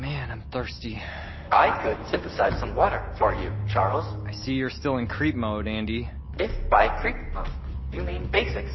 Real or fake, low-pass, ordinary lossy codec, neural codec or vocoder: real; 7.2 kHz; MP3, 24 kbps; none